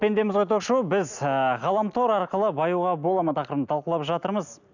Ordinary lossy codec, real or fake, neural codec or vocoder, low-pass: none; real; none; 7.2 kHz